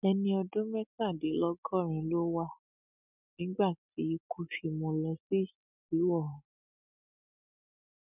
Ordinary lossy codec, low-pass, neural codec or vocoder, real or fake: none; 3.6 kHz; none; real